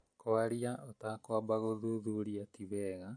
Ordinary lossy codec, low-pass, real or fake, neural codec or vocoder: MP3, 48 kbps; 9.9 kHz; real; none